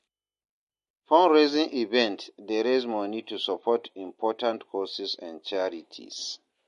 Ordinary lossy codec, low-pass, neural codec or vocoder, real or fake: MP3, 48 kbps; 14.4 kHz; none; real